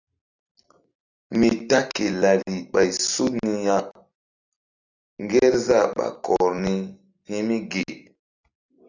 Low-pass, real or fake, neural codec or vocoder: 7.2 kHz; real; none